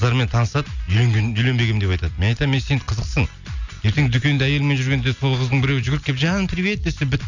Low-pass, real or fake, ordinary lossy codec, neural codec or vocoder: 7.2 kHz; real; none; none